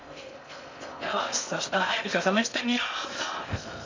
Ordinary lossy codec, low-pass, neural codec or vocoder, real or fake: MP3, 64 kbps; 7.2 kHz; codec, 16 kHz in and 24 kHz out, 0.6 kbps, FocalCodec, streaming, 4096 codes; fake